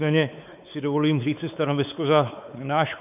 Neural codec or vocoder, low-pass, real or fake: codec, 16 kHz, 4 kbps, X-Codec, WavLM features, trained on Multilingual LibriSpeech; 3.6 kHz; fake